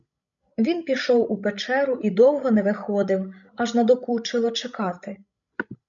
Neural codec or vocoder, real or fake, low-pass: codec, 16 kHz, 16 kbps, FreqCodec, larger model; fake; 7.2 kHz